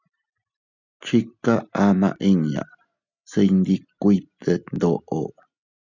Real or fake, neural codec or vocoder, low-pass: real; none; 7.2 kHz